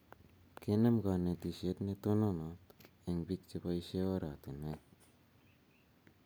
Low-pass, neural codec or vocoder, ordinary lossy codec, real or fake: none; none; none; real